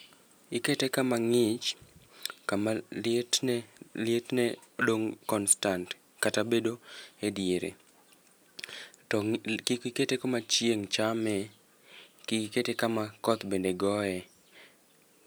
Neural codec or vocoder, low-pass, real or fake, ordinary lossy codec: none; none; real; none